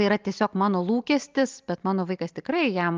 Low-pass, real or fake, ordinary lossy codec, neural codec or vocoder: 7.2 kHz; real; Opus, 24 kbps; none